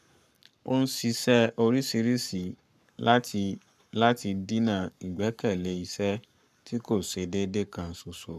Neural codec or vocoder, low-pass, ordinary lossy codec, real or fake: codec, 44.1 kHz, 7.8 kbps, Pupu-Codec; 14.4 kHz; none; fake